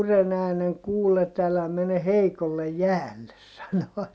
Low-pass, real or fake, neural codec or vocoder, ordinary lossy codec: none; real; none; none